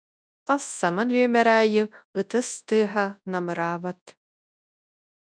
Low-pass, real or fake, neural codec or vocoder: 9.9 kHz; fake; codec, 24 kHz, 0.9 kbps, WavTokenizer, large speech release